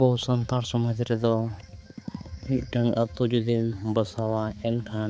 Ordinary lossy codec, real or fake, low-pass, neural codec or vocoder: none; fake; none; codec, 16 kHz, 4 kbps, X-Codec, HuBERT features, trained on balanced general audio